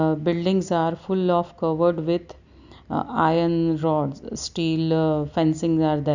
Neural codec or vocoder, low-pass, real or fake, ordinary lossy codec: none; 7.2 kHz; real; none